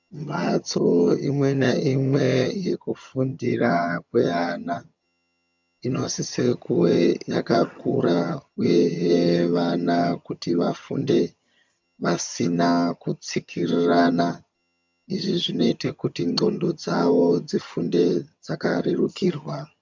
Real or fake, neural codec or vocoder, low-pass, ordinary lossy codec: fake; vocoder, 22.05 kHz, 80 mel bands, HiFi-GAN; 7.2 kHz; MP3, 64 kbps